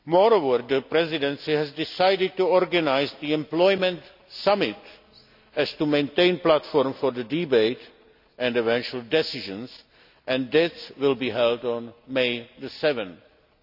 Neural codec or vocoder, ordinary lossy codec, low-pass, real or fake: none; none; 5.4 kHz; real